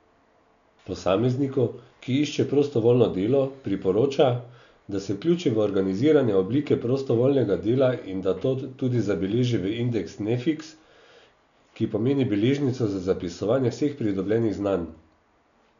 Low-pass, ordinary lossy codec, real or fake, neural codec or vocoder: 7.2 kHz; none; real; none